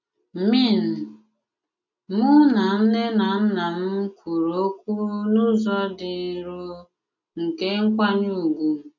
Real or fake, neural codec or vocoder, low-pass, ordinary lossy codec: real; none; 7.2 kHz; none